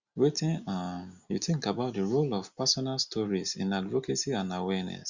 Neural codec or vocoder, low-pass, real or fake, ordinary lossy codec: none; 7.2 kHz; real; Opus, 64 kbps